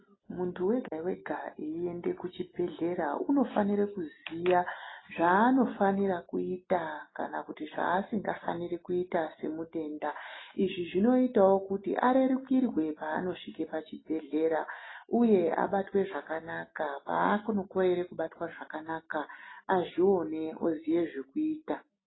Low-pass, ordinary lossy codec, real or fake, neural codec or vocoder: 7.2 kHz; AAC, 16 kbps; real; none